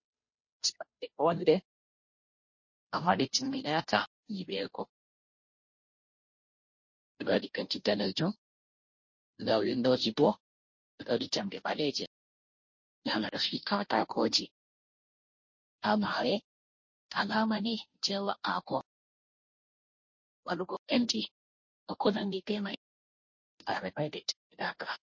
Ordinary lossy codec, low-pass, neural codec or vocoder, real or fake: MP3, 32 kbps; 7.2 kHz; codec, 16 kHz, 0.5 kbps, FunCodec, trained on Chinese and English, 25 frames a second; fake